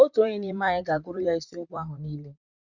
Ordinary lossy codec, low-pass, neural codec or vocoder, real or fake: Opus, 64 kbps; 7.2 kHz; vocoder, 44.1 kHz, 128 mel bands, Pupu-Vocoder; fake